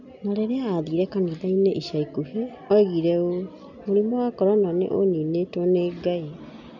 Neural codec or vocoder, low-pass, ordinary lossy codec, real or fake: none; 7.2 kHz; none; real